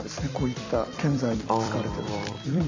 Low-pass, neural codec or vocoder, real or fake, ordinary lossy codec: 7.2 kHz; vocoder, 44.1 kHz, 128 mel bands every 256 samples, BigVGAN v2; fake; AAC, 48 kbps